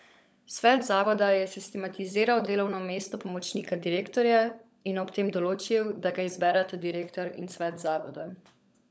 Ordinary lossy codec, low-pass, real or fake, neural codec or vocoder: none; none; fake; codec, 16 kHz, 16 kbps, FunCodec, trained on LibriTTS, 50 frames a second